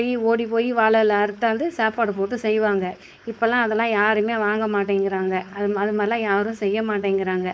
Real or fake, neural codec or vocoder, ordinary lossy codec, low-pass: fake; codec, 16 kHz, 4.8 kbps, FACodec; none; none